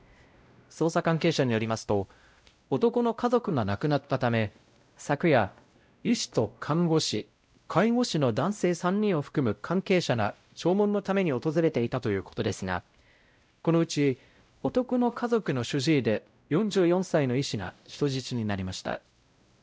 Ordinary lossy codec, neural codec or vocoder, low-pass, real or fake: none; codec, 16 kHz, 0.5 kbps, X-Codec, WavLM features, trained on Multilingual LibriSpeech; none; fake